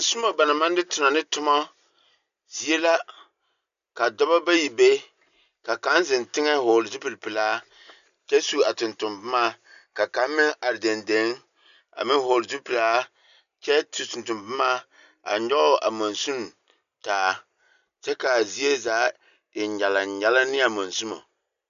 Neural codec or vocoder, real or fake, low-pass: none; real; 7.2 kHz